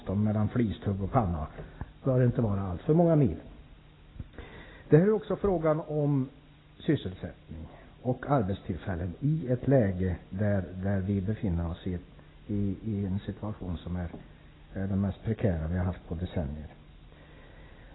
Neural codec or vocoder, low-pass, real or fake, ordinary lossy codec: none; 7.2 kHz; real; AAC, 16 kbps